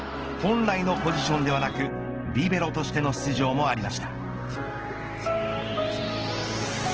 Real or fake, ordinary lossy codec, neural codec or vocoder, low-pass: real; Opus, 16 kbps; none; 7.2 kHz